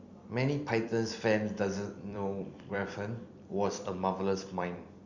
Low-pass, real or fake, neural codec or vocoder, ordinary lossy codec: 7.2 kHz; fake; vocoder, 44.1 kHz, 128 mel bands every 256 samples, BigVGAN v2; Opus, 64 kbps